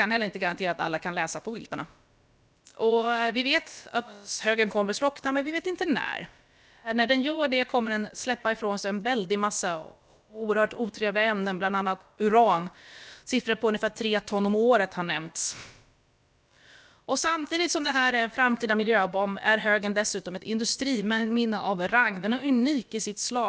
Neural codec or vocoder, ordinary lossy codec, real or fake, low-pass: codec, 16 kHz, about 1 kbps, DyCAST, with the encoder's durations; none; fake; none